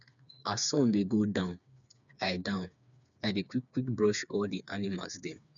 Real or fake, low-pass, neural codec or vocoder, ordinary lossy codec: fake; 7.2 kHz; codec, 16 kHz, 4 kbps, FreqCodec, smaller model; none